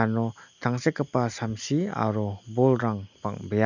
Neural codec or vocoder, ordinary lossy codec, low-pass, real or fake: none; none; 7.2 kHz; real